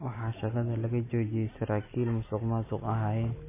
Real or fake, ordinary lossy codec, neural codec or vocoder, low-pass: real; MP3, 16 kbps; none; 3.6 kHz